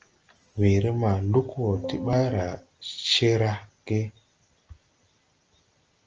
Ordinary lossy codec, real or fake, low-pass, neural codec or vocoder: Opus, 24 kbps; real; 7.2 kHz; none